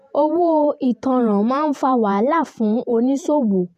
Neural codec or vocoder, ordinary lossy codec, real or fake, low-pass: vocoder, 48 kHz, 128 mel bands, Vocos; none; fake; 14.4 kHz